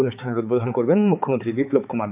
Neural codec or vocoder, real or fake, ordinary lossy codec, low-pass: codec, 16 kHz, 4 kbps, X-Codec, HuBERT features, trained on balanced general audio; fake; AAC, 32 kbps; 3.6 kHz